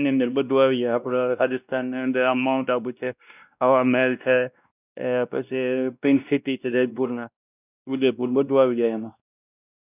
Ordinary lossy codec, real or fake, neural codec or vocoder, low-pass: none; fake; codec, 16 kHz, 1 kbps, X-Codec, WavLM features, trained on Multilingual LibriSpeech; 3.6 kHz